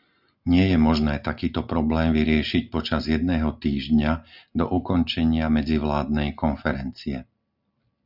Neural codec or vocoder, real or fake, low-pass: none; real; 5.4 kHz